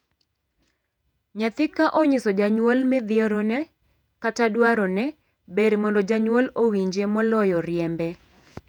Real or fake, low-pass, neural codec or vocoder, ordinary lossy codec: fake; 19.8 kHz; vocoder, 48 kHz, 128 mel bands, Vocos; none